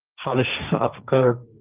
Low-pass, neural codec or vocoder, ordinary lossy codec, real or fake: 3.6 kHz; codec, 16 kHz, 1.1 kbps, Voila-Tokenizer; Opus, 24 kbps; fake